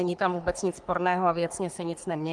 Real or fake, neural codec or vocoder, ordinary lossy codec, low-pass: fake; codec, 44.1 kHz, 3.4 kbps, Pupu-Codec; Opus, 24 kbps; 10.8 kHz